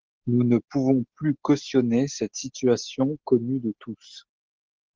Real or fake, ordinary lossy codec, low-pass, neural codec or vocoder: real; Opus, 16 kbps; 7.2 kHz; none